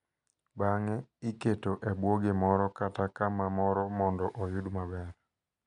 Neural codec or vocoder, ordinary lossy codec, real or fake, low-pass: none; none; real; 10.8 kHz